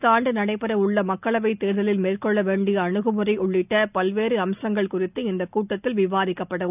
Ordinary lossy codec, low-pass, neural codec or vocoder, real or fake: none; 3.6 kHz; none; real